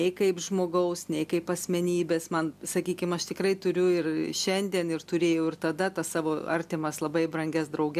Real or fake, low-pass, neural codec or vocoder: real; 14.4 kHz; none